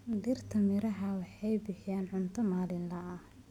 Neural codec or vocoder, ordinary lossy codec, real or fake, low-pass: none; none; real; 19.8 kHz